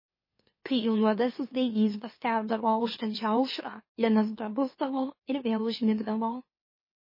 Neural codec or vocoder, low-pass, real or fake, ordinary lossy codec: autoencoder, 44.1 kHz, a latent of 192 numbers a frame, MeloTTS; 5.4 kHz; fake; MP3, 24 kbps